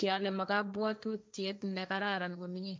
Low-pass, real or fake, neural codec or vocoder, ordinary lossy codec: none; fake; codec, 16 kHz, 1.1 kbps, Voila-Tokenizer; none